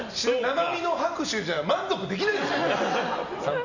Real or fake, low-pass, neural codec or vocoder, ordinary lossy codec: real; 7.2 kHz; none; none